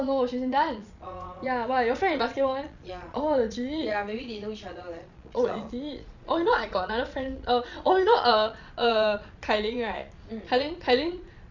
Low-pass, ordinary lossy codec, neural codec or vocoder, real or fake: 7.2 kHz; none; vocoder, 22.05 kHz, 80 mel bands, Vocos; fake